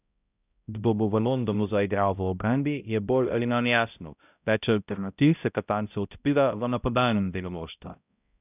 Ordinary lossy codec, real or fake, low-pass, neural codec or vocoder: none; fake; 3.6 kHz; codec, 16 kHz, 0.5 kbps, X-Codec, HuBERT features, trained on balanced general audio